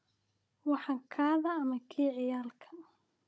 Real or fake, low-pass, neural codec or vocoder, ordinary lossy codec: fake; none; codec, 16 kHz, 16 kbps, FunCodec, trained on Chinese and English, 50 frames a second; none